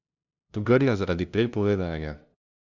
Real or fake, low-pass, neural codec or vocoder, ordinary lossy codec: fake; 7.2 kHz; codec, 16 kHz, 0.5 kbps, FunCodec, trained on LibriTTS, 25 frames a second; none